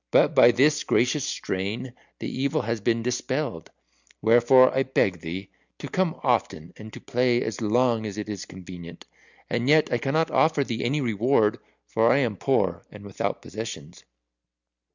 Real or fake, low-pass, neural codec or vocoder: real; 7.2 kHz; none